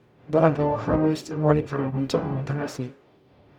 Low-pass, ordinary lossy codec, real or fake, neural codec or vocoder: 19.8 kHz; none; fake; codec, 44.1 kHz, 0.9 kbps, DAC